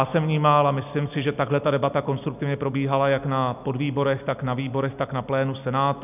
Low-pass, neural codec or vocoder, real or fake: 3.6 kHz; none; real